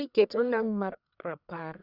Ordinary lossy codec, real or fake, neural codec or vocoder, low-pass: none; fake; codec, 44.1 kHz, 1.7 kbps, Pupu-Codec; 5.4 kHz